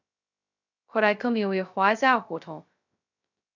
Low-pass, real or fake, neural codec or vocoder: 7.2 kHz; fake; codec, 16 kHz, 0.2 kbps, FocalCodec